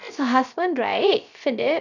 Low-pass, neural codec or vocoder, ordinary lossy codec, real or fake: 7.2 kHz; codec, 16 kHz, 0.3 kbps, FocalCodec; none; fake